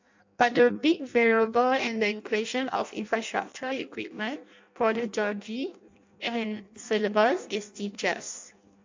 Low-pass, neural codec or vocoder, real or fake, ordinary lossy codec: 7.2 kHz; codec, 16 kHz in and 24 kHz out, 0.6 kbps, FireRedTTS-2 codec; fake; MP3, 64 kbps